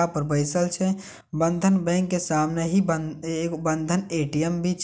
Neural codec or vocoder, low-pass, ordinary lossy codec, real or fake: none; none; none; real